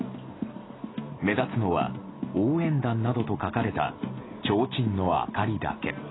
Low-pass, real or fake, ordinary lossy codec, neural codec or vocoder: 7.2 kHz; real; AAC, 16 kbps; none